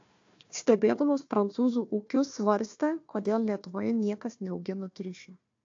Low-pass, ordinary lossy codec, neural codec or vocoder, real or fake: 7.2 kHz; AAC, 48 kbps; codec, 16 kHz, 1 kbps, FunCodec, trained on Chinese and English, 50 frames a second; fake